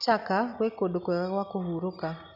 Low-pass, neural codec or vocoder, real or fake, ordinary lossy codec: 5.4 kHz; none; real; none